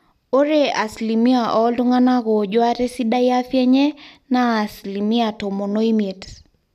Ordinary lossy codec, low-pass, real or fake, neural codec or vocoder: none; 14.4 kHz; real; none